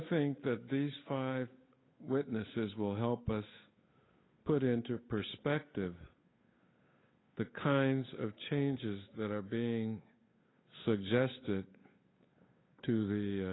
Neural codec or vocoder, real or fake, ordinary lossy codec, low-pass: codec, 16 kHz, 8 kbps, FunCodec, trained on Chinese and English, 25 frames a second; fake; AAC, 16 kbps; 7.2 kHz